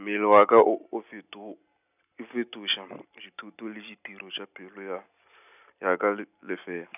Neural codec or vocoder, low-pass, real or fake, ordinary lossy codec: none; 3.6 kHz; real; none